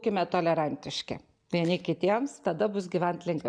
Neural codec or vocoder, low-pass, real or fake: none; 9.9 kHz; real